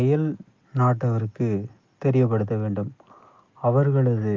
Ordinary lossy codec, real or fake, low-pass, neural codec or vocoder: Opus, 24 kbps; real; 7.2 kHz; none